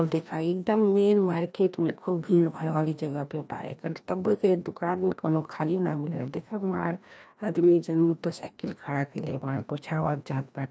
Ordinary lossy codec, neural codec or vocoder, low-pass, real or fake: none; codec, 16 kHz, 1 kbps, FreqCodec, larger model; none; fake